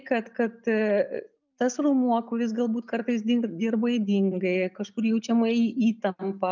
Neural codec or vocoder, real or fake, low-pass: none; real; 7.2 kHz